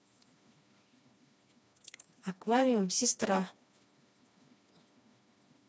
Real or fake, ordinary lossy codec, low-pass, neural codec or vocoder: fake; none; none; codec, 16 kHz, 2 kbps, FreqCodec, smaller model